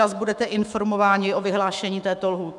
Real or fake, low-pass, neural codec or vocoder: fake; 10.8 kHz; autoencoder, 48 kHz, 128 numbers a frame, DAC-VAE, trained on Japanese speech